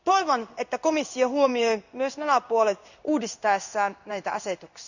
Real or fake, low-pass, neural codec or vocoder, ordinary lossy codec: fake; 7.2 kHz; codec, 16 kHz in and 24 kHz out, 1 kbps, XY-Tokenizer; none